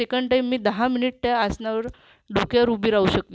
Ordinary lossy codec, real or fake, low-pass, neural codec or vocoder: none; real; none; none